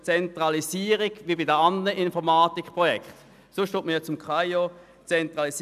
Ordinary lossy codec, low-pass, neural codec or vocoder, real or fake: none; 14.4 kHz; none; real